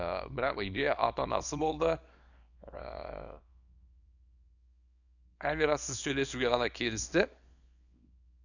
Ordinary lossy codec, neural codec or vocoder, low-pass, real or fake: none; codec, 24 kHz, 0.9 kbps, WavTokenizer, small release; 7.2 kHz; fake